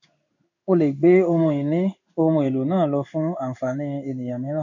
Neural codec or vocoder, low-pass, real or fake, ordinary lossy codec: codec, 16 kHz in and 24 kHz out, 1 kbps, XY-Tokenizer; 7.2 kHz; fake; none